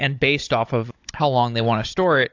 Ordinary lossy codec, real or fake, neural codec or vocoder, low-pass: AAC, 48 kbps; fake; codec, 16 kHz, 16 kbps, FunCodec, trained on Chinese and English, 50 frames a second; 7.2 kHz